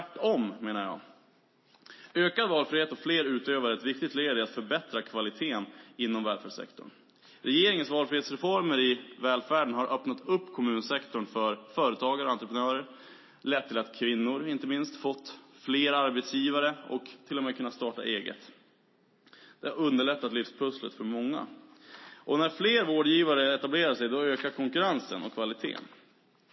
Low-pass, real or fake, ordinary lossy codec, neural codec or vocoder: 7.2 kHz; real; MP3, 24 kbps; none